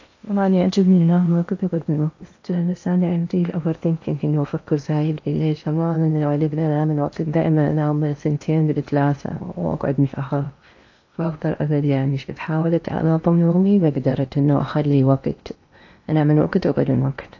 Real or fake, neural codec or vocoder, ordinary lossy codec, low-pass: fake; codec, 16 kHz in and 24 kHz out, 0.8 kbps, FocalCodec, streaming, 65536 codes; AAC, 48 kbps; 7.2 kHz